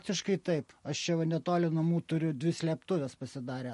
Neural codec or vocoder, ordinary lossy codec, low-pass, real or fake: none; MP3, 48 kbps; 14.4 kHz; real